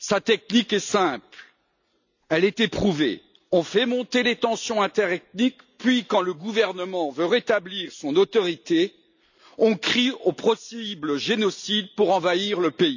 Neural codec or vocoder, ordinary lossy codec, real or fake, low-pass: none; none; real; 7.2 kHz